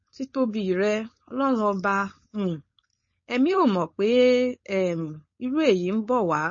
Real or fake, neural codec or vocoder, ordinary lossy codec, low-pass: fake; codec, 16 kHz, 4.8 kbps, FACodec; MP3, 32 kbps; 7.2 kHz